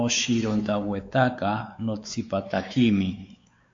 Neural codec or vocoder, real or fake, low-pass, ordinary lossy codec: codec, 16 kHz, 4 kbps, X-Codec, WavLM features, trained on Multilingual LibriSpeech; fake; 7.2 kHz; MP3, 48 kbps